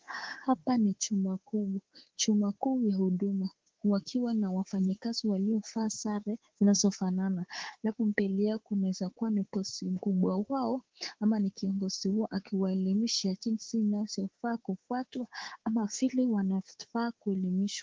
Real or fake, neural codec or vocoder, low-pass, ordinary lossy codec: fake; codec, 24 kHz, 3.1 kbps, DualCodec; 7.2 kHz; Opus, 16 kbps